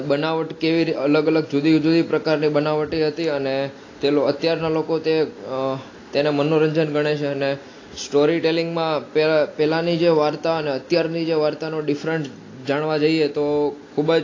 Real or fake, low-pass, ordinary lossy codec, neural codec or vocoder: real; 7.2 kHz; AAC, 32 kbps; none